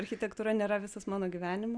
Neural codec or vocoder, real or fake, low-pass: none; real; 9.9 kHz